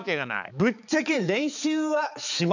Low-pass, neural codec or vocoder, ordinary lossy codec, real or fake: 7.2 kHz; codec, 16 kHz, 4 kbps, X-Codec, HuBERT features, trained on balanced general audio; none; fake